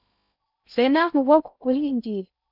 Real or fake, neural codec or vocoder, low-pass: fake; codec, 16 kHz in and 24 kHz out, 0.6 kbps, FocalCodec, streaming, 2048 codes; 5.4 kHz